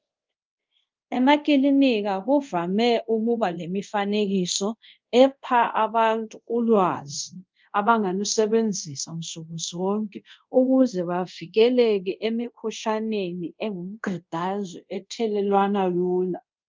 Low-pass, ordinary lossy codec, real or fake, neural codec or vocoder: 7.2 kHz; Opus, 24 kbps; fake; codec, 24 kHz, 0.5 kbps, DualCodec